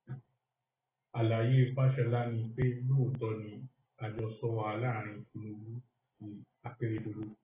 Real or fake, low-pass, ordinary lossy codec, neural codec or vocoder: real; 3.6 kHz; AAC, 16 kbps; none